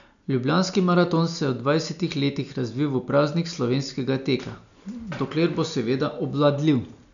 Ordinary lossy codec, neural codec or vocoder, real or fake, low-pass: none; none; real; 7.2 kHz